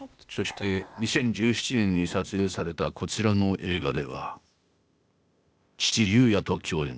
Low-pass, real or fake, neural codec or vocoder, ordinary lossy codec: none; fake; codec, 16 kHz, 0.8 kbps, ZipCodec; none